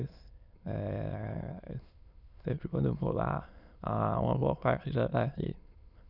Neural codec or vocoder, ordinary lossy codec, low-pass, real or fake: autoencoder, 22.05 kHz, a latent of 192 numbers a frame, VITS, trained on many speakers; none; 5.4 kHz; fake